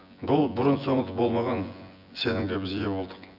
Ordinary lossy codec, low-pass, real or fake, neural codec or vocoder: none; 5.4 kHz; fake; vocoder, 24 kHz, 100 mel bands, Vocos